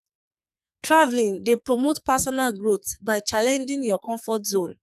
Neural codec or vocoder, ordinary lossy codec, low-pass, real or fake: codec, 44.1 kHz, 2.6 kbps, SNAC; none; 14.4 kHz; fake